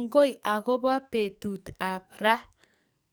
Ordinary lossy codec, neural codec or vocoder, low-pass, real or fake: none; codec, 44.1 kHz, 2.6 kbps, SNAC; none; fake